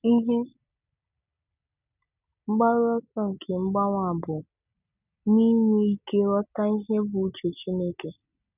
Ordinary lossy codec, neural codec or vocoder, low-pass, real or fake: none; none; 3.6 kHz; real